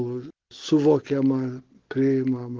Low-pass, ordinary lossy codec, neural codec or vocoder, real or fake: 7.2 kHz; Opus, 16 kbps; none; real